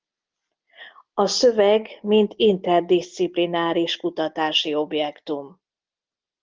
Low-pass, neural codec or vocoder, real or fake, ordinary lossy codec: 7.2 kHz; none; real; Opus, 24 kbps